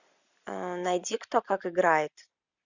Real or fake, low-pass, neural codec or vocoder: real; 7.2 kHz; none